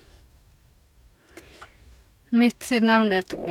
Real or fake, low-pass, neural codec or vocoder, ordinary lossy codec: fake; 19.8 kHz; codec, 44.1 kHz, 2.6 kbps, DAC; none